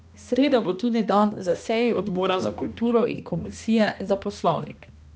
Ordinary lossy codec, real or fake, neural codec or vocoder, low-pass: none; fake; codec, 16 kHz, 1 kbps, X-Codec, HuBERT features, trained on balanced general audio; none